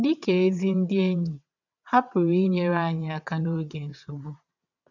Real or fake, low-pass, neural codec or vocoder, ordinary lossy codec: fake; 7.2 kHz; vocoder, 22.05 kHz, 80 mel bands, WaveNeXt; none